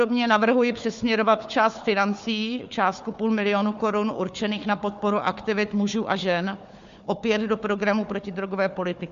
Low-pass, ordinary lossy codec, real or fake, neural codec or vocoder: 7.2 kHz; MP3, 48 kbps; fake; codec, 16 kHz, 4 kbps, FunCodec, trained on Chinese and English, 50 frames a second